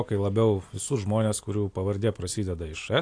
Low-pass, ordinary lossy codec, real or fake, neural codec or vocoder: 9.9 kHz; AAC, 48 kbps; real; none